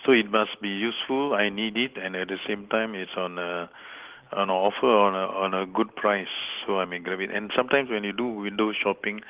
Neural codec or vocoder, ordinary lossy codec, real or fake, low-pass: none; Opus, 16 kbps; real; 3.6 kHz